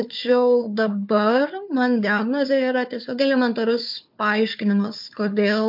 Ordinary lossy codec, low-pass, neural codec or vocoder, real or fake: MP3, 48 kbps; 5.4 kHz; codec, 16 kHz, 4 kbps, FunCodec, trained on Chinese and English, 50 frames a second; fake